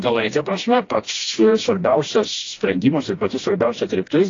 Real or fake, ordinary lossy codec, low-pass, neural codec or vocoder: fake; AAC, 32 kbps; 7.2 kHz; codec, 16 kHz, 1 kbps, FreqCodec, smaller model